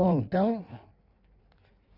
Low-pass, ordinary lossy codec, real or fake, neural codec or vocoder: 5.4 kHz; none; fake; codec, 16 kHz in and 24 kHz out, 1.1 kbps, FireRedTTS-2 codec